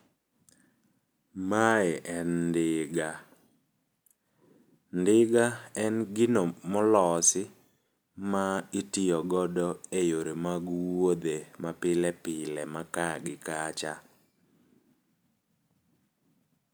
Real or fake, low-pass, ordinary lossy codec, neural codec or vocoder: real; none; none; none